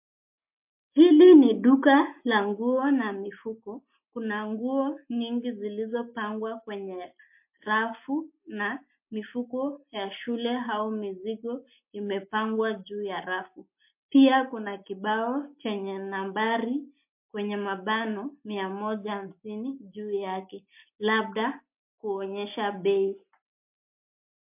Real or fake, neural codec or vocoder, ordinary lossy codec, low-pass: real; none; MP3, 32 kbps; 3.6 kHz